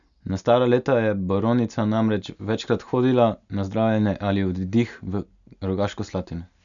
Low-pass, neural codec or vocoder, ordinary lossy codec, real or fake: 7.2 kHz; none; none; real